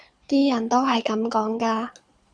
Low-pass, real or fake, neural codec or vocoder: 9.9 kHz; fake; codec, 24 kHz, 6 kbps, HILCodec